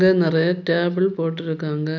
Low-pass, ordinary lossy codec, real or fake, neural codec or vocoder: 7.2 kHz; none; real; none